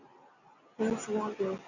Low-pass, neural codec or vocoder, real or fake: 7.2 kHz; none; real